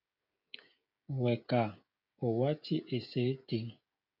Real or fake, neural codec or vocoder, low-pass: fake; codec, 16 kHz, 16 kbps, FreqCodec, smaller model; 5.4 kHz